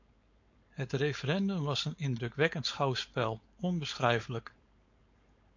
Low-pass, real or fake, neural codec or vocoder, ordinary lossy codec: 7.2 kHz; fake; codec, 16 kHz, 4.8 kbps, FACodec; AAC, 48 kbps